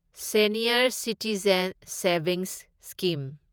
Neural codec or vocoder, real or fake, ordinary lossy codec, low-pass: vocoder, 48 kHz, 128 mel bands, Vocos; fake; none; none